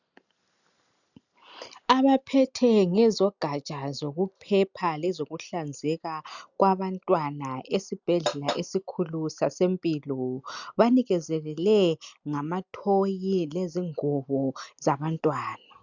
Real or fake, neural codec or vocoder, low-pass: real; none; 7.2 kHz